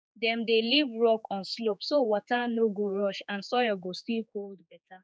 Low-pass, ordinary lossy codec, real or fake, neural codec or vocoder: none; none; fake; codec, 16 kHz, 4 kbps, X-Codec, HuBERT features, trained on general audio